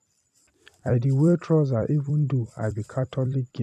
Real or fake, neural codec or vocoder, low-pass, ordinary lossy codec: fake; vocoder, 44.1 kHz, 128 mel bands every 256 samples, BigVGAN v2; 14.4 kHz; none